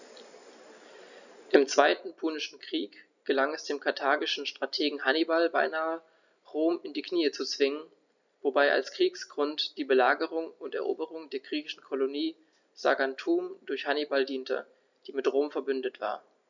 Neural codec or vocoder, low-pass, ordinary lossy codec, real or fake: none; 7.2 kHz; none; real